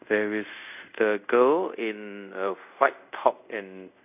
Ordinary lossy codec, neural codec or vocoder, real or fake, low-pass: none; codec, 24 kHz, 0.5 kbps, DualCodec; fake; 3.6 kHz